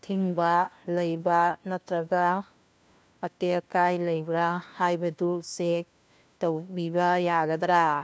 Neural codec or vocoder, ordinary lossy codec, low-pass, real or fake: codec, 16 kHz, 1 kbps, FunCodec, trained on LibriTTS, 50 frames a second; none; none; fake